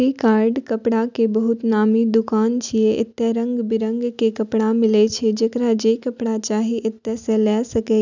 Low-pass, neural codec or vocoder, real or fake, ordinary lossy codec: 7.2 kHz; none; real; none